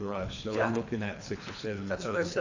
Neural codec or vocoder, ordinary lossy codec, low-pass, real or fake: codec, 24 kHz, 3 kbps, HILCodec; AAC, 48 kbps; 7.2 kHz; fake